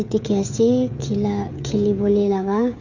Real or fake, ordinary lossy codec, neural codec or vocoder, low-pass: fake; none; codec, 16 kHz, 16 kbps, FreqCodec, smaller model; 7.2 kHz